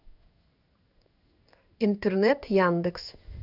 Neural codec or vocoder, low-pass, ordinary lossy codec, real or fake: codec, 16 kHz in and 24 kHz out, 1 kbps, XY-Tokenizer; 5.4 kHz; none; fake